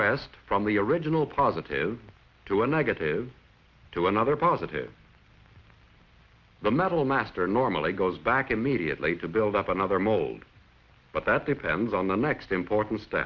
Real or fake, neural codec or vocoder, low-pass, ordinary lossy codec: real; none; 7.2 kHz; Opus, 32 kbps